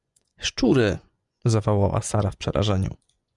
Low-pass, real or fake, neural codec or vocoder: 10.8 kHz; fake; vocoder, 24 kHz, 100 mel bands, Vocos